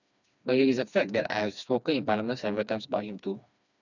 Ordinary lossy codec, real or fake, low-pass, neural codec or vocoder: none; fake; 7.2 kHz; codec, 16 kHz, 2 kbps, FreqCodec, smaller model